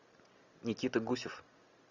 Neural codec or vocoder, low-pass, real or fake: none; 7.2 kHz; real